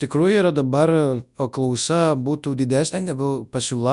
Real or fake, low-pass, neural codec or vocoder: fake; 10.8 kHz; codec, 24 kHz, 0.9 kbps, WavTokenizer, large speech release